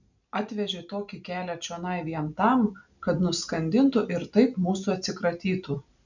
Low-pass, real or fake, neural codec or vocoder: 7.2 kHz; real; none